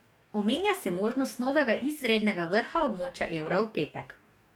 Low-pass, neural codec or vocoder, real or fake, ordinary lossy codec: 19.8 kHz; codec, 44.1 kHz, 2.6 kbps, DAC; fake; none